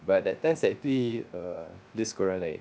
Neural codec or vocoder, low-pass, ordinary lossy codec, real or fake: codec, 16 kHz, 0.3 kbps, FocalCodec; none; none; fake